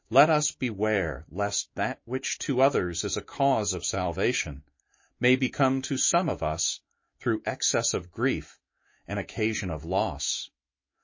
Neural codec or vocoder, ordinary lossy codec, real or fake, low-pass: autoencoder, 48 kHz, 128 numbers a frame, DAC-VAE, trained on Japanese speech; MP3, 32 kbps; fake; 7.2 kHz